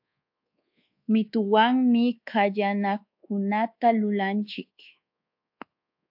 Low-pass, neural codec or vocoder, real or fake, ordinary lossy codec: 5.4 kHz; codec, 24 kHz, 1.2 kbps, DualCodec; fake; AAC, 48 kbps